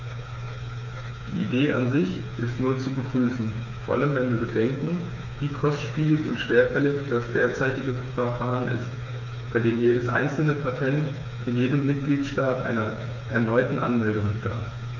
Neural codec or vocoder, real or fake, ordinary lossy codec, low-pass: codec, 16 kHz, 4 kbps, FreqCodec, smaller model; fake; none; 7.2 kHz